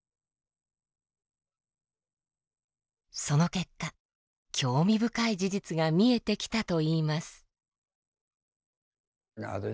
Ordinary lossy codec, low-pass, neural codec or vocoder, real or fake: none; none; none; real